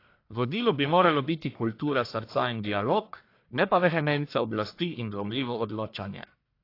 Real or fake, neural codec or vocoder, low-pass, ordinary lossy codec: fake; codec, 44.1 kHz, 1.7 kbps, Pupu-Codec; 5.4 kHz; AAC, 32 kbps